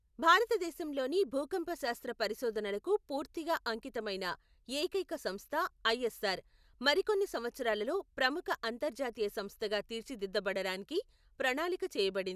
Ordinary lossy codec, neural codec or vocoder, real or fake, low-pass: none; none; real; 14.4 kHz